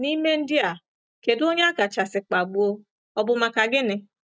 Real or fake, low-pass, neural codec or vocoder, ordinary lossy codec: real; none; none; none